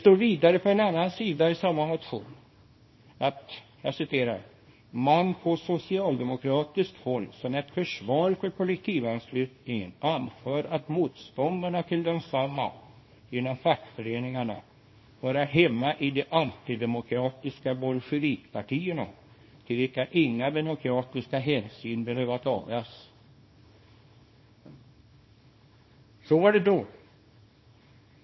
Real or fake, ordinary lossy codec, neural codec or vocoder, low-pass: fake; MP3, 24 kbps; codec, 24 kHz, 0.9 kbps, WavTokenizer, small release; 7.2 kHz